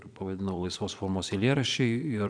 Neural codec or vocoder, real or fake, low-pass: vocoder, 22.05 kHz, 80 mel bands, Vocos; fake; 9.9 kHz